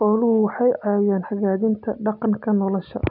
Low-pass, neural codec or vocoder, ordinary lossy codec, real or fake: 5.4 kHz; none; none; real